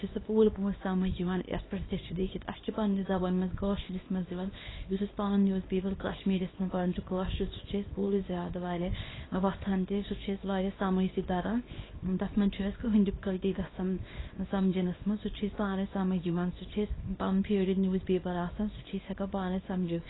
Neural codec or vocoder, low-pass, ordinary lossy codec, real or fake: codec, 24 kHz, 0.9 kbps, WavTokenizer, small release; 7.2 kHz; AAC, 16 kbps; fake